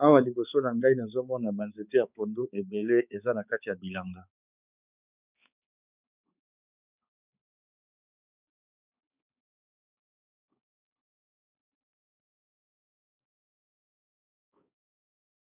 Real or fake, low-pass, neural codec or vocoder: fake; 3.6 kHz; codec, 16 kHz, 4 kbps, X-Codec, HuBERT features, trained on balanced general audio